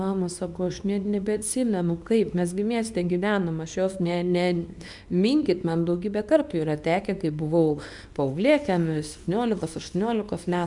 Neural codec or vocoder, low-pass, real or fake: codec, 24 kHz, 0.9 kbps, WavTokenizer, small release; 10.8 kHz; fake